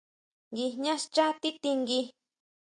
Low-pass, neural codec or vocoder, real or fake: 9.9 kHz; none; real